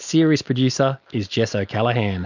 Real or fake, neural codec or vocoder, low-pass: real; none; 7.2 kHz